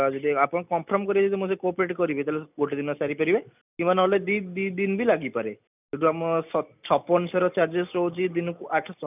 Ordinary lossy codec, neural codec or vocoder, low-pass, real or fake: none; none; 3.6 kHz; real